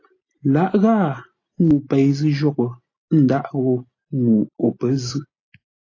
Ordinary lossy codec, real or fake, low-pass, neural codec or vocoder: AAC, 32 kbps; real; 7.2 kHz; none